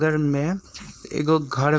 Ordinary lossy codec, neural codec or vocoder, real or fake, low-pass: none; codec, 16 kHz, 4.8 kbps, FACodec; fake; none